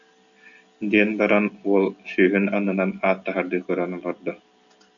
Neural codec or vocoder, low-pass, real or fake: none; 7.2 kHz; real